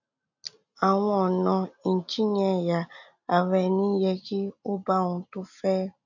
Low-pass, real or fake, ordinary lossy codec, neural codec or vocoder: 7.2 kHz; real; none; none